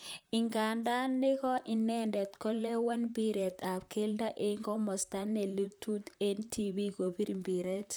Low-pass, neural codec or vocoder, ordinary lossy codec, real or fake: none; vocoder, 44.1 kHz, 128 mel bands, Pupu-Vocoder; none; fake